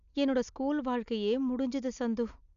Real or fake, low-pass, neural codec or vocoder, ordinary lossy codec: real; 7.2 kHz; none; none